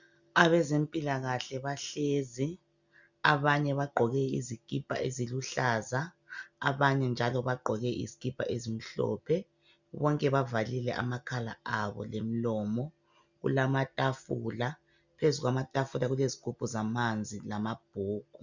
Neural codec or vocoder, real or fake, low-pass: none; real; 7.2 kHz